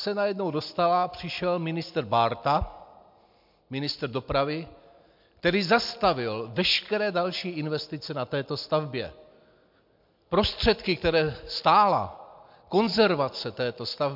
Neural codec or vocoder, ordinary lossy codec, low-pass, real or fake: none; MP3, 48 kbps; 5.4 kHz; real